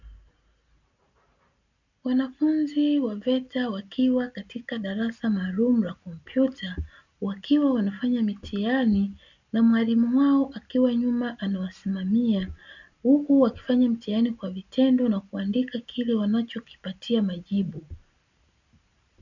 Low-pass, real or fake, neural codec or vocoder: 7.2 kHz; real; none